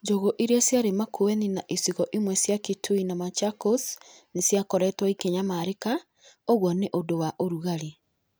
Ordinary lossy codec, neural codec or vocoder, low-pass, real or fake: none; none; none; real